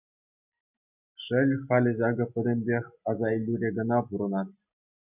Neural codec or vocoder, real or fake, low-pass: none; real; 3.6 kHz